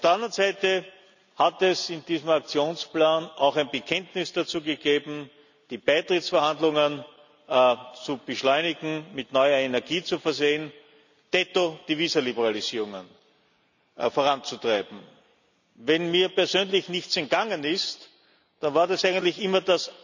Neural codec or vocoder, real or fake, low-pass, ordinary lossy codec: none; real; 7.2 kHz; none